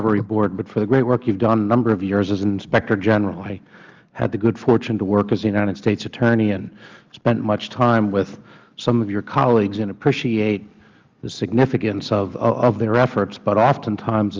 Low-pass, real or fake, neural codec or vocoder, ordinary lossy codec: 7.2 kHz; real; none; Opus, 24 kbps